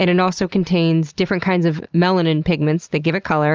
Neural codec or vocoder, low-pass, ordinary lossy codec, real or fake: none; 7.2 kHz; Opus, 24 kbps; real